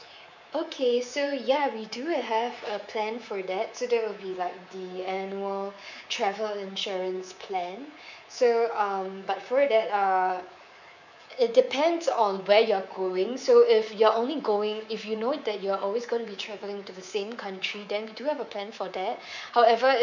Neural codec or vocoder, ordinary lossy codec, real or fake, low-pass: codec, 24 kHz, 3.1 kbps, DualCodec; none; fake; 7.2 kHz